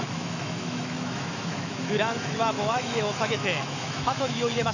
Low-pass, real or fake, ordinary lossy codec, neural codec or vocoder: 7.2 kHz; fake; none; autoencoder, 48 kHz, 128 numbers a frame, DAC-VAE, trained on Japanese speech